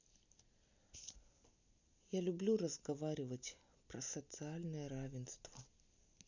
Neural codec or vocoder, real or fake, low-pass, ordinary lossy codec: none; real; 7.2 kHz; none